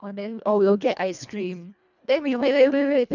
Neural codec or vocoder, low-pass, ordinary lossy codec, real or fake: codec, 24 kHz, 1.5 kbps, HILCodec; 7.2 kHz; none; fake